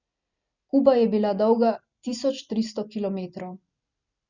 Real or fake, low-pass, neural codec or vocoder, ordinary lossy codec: real; 7.2 kHz; none; none